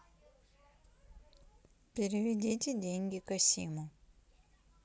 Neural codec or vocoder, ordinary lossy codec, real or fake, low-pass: none; none; real; none